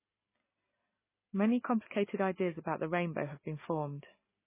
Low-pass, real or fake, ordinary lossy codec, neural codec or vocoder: 3.6 kHz; real; MP3, 16 kbps; none